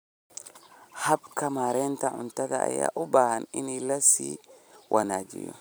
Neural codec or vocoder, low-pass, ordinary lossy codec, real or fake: vocoder, 44.1 kHz, 128 mel bands every 256 samples, BigVGAN v2; none; none; fake